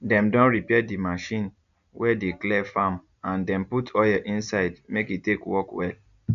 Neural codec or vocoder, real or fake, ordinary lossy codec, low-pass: none; real; none; 7.2 kHz